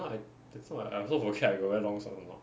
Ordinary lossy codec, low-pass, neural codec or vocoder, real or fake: none; none; none; real